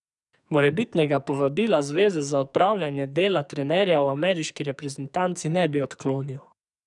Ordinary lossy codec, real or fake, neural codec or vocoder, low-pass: none; fake; codec, 44.1 kHz, 2.6 kbps, SNAC; 10.8 kHz